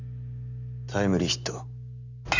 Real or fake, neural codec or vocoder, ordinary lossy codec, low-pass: real; none; none; 7.2 kHz